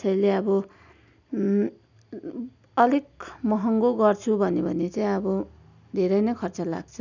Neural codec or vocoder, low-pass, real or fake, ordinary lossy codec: none; 7.2 kHz; real; none